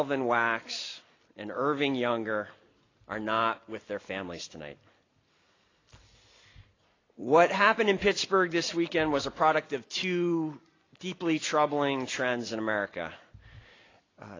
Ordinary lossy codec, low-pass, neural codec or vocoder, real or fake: AAC, 32 kbps; 7.2 kHz; none; real